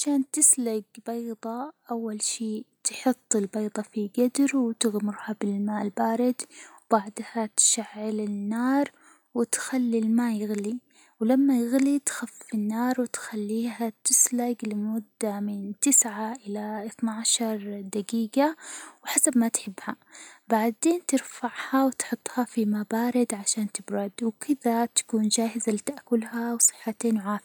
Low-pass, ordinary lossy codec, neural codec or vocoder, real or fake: none; none; none; real